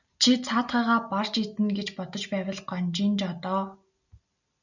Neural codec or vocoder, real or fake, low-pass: none; real; 7.2 kHz